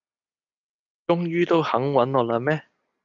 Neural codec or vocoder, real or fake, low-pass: none; real; 7.2 kHz